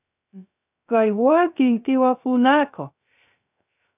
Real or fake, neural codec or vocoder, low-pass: fake; codec, 16 kHz, 0.3 kbps, FocalCodec; 3.6 kHz